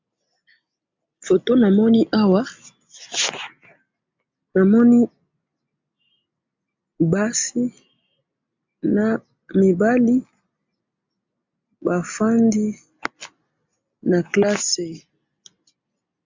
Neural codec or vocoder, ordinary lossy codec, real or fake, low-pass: none; MP3, 64 kbps; real; 7.2 kHz